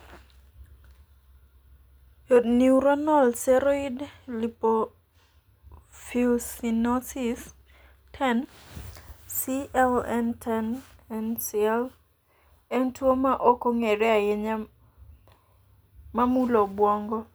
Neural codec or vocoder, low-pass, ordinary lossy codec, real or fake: none; none; none; real